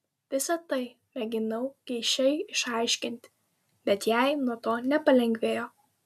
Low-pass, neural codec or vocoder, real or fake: 14.4 kHz; none; real